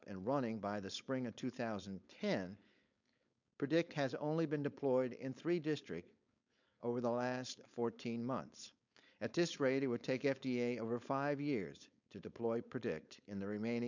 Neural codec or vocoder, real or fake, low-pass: codec, 16 kHz, 4.8 kbps, FACodec; fake; 7.2 kHz